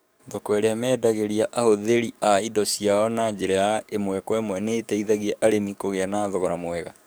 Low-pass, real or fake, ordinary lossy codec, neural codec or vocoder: none; fake; none; codec, 44.1 kHz, 7.8 kbps, DAC